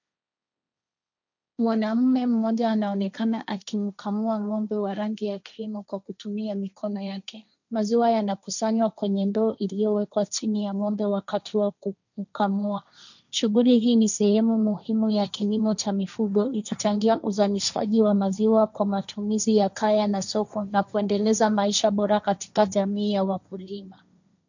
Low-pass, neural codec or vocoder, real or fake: 7.2 kHz; codec, 16 kHz, 1.1 kbps, Voila-Tokenizer; fake